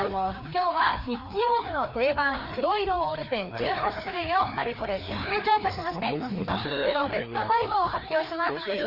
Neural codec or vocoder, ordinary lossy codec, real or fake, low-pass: codec, 16 kHz, 2 kbps, FreqCodec, larger model; Opus, 64 kbps; fake; 5.4 kHz